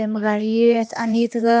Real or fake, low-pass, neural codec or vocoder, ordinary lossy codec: fake; none; codec, 16 kHz, 2 kbps, X-Codec, HuBERT features, trained on LibriSpeech; none